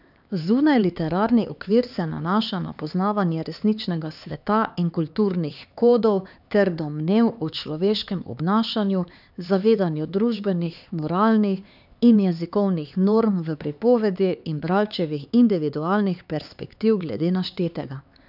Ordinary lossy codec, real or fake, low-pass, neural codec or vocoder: none; fake; 5.4 kHz; codec, 16 kHz, 4 kbps, X-Codec, HuBERT features, trained on LibriSpeech